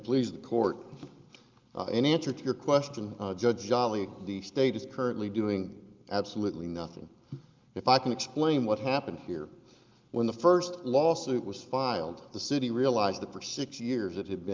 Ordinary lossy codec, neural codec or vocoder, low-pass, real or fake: Opus, 24 kbps; none; 7.2 kHz; real